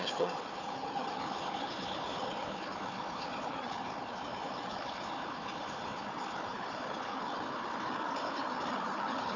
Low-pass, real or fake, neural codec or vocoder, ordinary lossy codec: 7.2 kHz; fake; codec, 16 kHz, 4 kbps, FunCodec, trained on Chinese and English, 50 frames a second; none